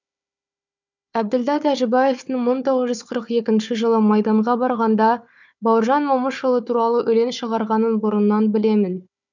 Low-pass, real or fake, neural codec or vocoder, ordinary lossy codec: 7.2 kHz; fake; codec, 16 kHz, 4 kbps, FunCodec, trained on Chinese and English, 50 frames a second; none